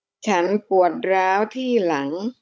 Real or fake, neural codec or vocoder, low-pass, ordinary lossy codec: fake; codec, 16 kHz, 16 kbps, FunCodec, trained on Chinese and English, 50 frames a second; none; none